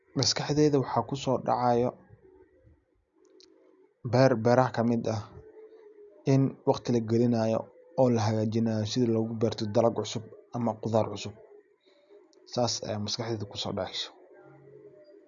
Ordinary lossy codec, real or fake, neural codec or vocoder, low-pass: none; real; none; 7.2 kHz